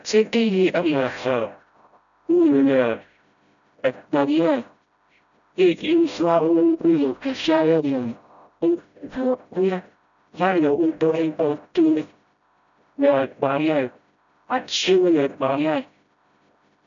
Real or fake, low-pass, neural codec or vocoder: fake; 7.2 kHz; codec, 16 kHz, 0.5 kbps, FreqCodec, smaller model